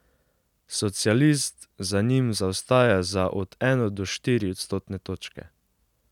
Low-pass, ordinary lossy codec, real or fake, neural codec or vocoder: 19.8 kHz; none; real; none